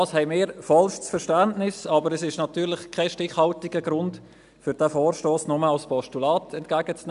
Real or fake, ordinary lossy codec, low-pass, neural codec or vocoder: real; none; 10.8 kHz; none